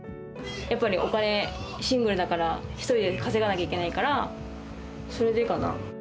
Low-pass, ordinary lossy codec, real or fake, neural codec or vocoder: none; none; real; none